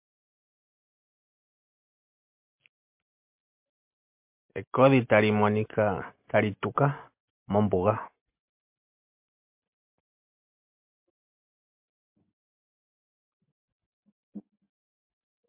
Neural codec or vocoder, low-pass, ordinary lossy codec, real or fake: none; 3.6 kHz; MP3, 32 kbps; real